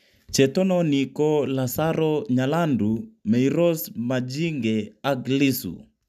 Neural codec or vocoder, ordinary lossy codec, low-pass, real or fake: none; none; 14.4 kHz; real